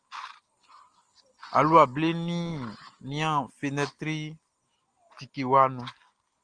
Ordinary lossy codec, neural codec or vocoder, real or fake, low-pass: Opus, 24 kbps; none; real; 9.9 kHz